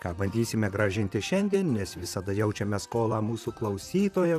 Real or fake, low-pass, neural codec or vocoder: fake; 14.4 kHz; vocoder, 44.1 kHz, 128 mel bands, Pupu-Vocoder